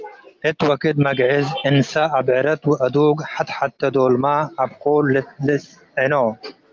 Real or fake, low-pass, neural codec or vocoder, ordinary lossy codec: real; 7.2 kHz; none; Opus, 24 kbps